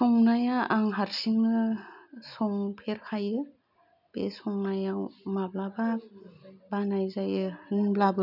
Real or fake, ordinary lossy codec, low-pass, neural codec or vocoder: real; none; 5.4 kHz; none